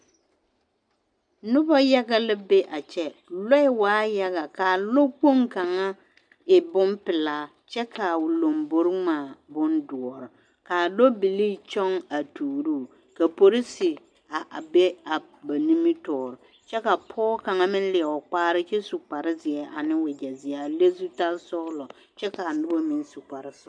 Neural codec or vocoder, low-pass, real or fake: none; 9.9 kHz; real